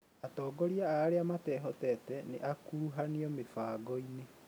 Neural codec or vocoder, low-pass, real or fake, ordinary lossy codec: none; none; real; none